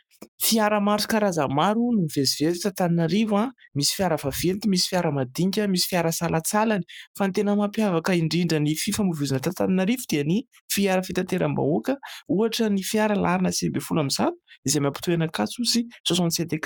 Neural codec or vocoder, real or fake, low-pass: codec, 44.1 kHz, 7.8 kbps, Pupu-Codec; fake; 19.8 kHz